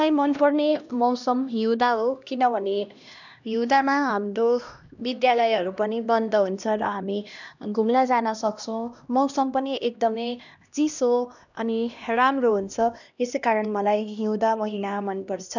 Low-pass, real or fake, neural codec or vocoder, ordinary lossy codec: 7.2 kHz; fake; codec, 16 kHz, 1 kbps, X-Codec, HuBERT features, trained on LibriSpeech; none